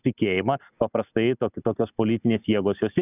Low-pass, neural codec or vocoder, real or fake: 3.6 kHz; none; real